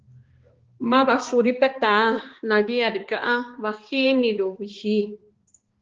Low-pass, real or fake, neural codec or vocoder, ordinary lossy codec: 7.2 kHz; fake; codec, 16 kHz, 2 kbps, X-Codec, HuBERT features, trained on balanced general audio; Opus, 16 kbps